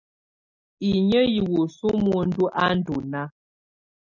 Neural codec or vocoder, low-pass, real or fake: none; 7.2 kHz; real